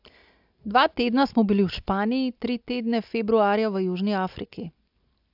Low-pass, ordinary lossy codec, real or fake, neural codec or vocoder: 5.4 kHz; AAC, 48 kbps; real; none